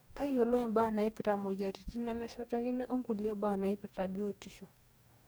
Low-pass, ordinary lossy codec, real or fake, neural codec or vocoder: none; none; fake; codec, 44.1 kHz, 2.6 kbps, DAC